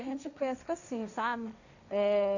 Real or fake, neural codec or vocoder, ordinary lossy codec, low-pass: fake; codec, 16 kHz, 1.1 kbps, Voila-Tokenizer; none; 7.2 kHz